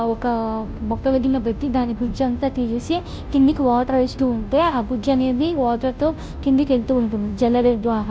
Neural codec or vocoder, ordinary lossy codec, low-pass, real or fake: codec, 16 kHz, 0.5 kbps, FunCodec, trained on Chinese and English, 25 frames a second; none; none; fake